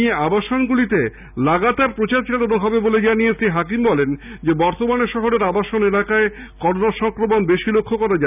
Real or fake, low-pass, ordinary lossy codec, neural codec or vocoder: real; 3.6 kHz; none; none